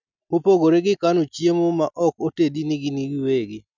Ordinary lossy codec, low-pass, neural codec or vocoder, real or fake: none; 7.2 kHz; none; real